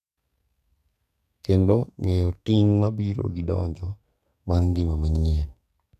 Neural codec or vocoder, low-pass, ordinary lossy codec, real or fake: codec, 44.1 kHz, 2.6 kbps, SNAC; 14.4 kHz; none; fake